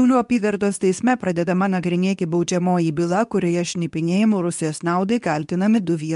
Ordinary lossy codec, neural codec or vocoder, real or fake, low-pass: MP3, 64 kbps; codec, 24 kHz, 0.9 kbps, WavTokenizer, medium speech release version 2; fake; 10.8 kHz